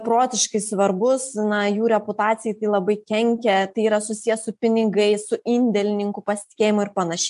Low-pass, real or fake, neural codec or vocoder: 10.8 kHz; real; none